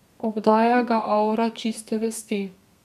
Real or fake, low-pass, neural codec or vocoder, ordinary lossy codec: fake; 14.4 kHz; codec, 32 kHz, 1.9 kbps, SNAC; none